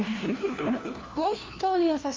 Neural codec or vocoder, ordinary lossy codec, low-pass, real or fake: codec, 16 kHz, 1 kbps, FunCodec, trained on LibriTTS, 50 frames a second; Opus, 32 kbps; 7.2 kHz; fake